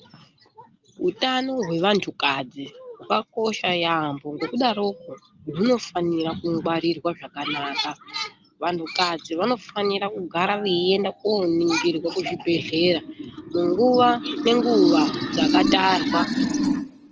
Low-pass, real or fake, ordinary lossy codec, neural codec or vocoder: 7.2 kHz; real; Opus, 24 kbps; none